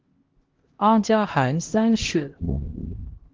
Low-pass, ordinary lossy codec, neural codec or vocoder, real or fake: 7.2 kHz; Opus, 16 kbps; codec, 16 kHz, 1 kbps, X-Codec, HuBERT features, trained on LibriSpeech; fake